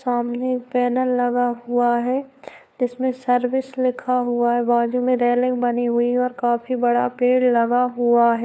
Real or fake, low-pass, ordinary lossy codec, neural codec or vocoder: fake; none; none; codec, 16 kHz, 4 kbps, FunCodec, trained on LibriTTS, 50 frames a second